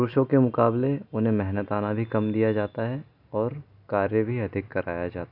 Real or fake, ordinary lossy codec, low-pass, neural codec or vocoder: real; none; 5.4 kHz; none